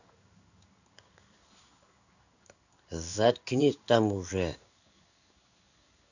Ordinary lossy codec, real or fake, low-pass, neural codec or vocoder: none; fake; 7.2 kHz; codec, 16 kHz in and 24 kHz out, 1 kbps, XY-Tokenizer